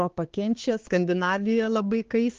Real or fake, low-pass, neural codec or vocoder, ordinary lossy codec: fake; 7.2 kHz; codec, 16 kHz, 4 kbps, X-Codec, HuBERT features, trained on balanced general audio; Opus, 16 kbps